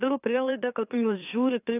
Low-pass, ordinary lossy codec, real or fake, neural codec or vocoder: 3.6 kHz; AAC, 24 kbps; fake; autoencoder, 44.1 kHz, a latent of 192 numbers a frame, MeloTTS